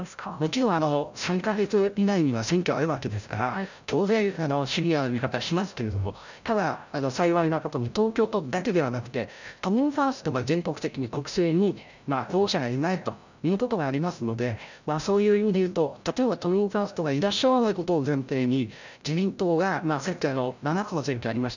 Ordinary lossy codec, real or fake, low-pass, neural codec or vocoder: none; fake; 7.2 kHz; codec, 16 kHz, 0.5 kbps, FreqCodec, larger model